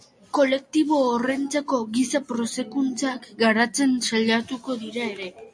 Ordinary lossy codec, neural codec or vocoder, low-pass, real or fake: MP3, 48 kbps; none; 9.9 kHz; real